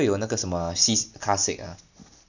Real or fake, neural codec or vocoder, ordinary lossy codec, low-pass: real; none; none; 7.2 kHz